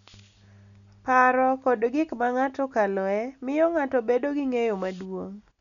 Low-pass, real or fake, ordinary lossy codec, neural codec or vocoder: 7.2 kHz; real; none; none